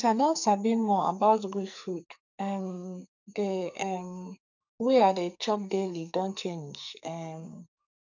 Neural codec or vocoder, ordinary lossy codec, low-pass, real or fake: codec, 44.1 kHz, 2.6 kbps, SNAC; none; 7.2 kHz; fake